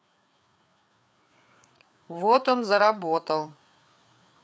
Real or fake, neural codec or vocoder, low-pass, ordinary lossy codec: fake; codec, 16 kHz, 4 kbps, FreqCodec, larger model; none; none